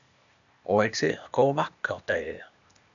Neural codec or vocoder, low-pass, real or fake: codec, 16 kHz, 0.8 kbps, ZipCodec; 7.2 kHz; fake